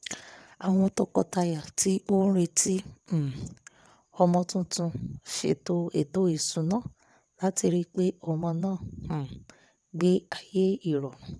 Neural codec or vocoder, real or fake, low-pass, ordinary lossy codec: vocoder, 22.05 kHz, 80 mel bands, WaveNeXt; fake; none; none